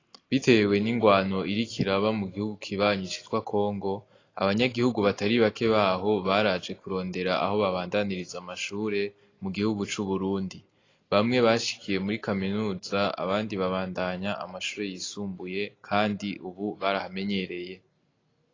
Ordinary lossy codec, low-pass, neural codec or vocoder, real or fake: AAC, 32 kbps; 7.2 kHz; none; real